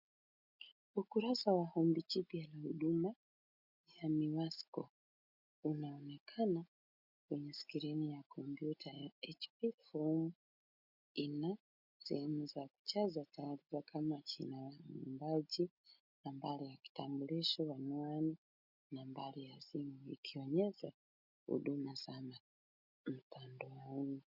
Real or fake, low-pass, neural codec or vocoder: real; 5.4 kHz; none